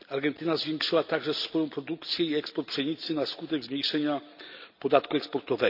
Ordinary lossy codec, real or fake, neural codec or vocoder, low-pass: none; real; none; 5.4 kHz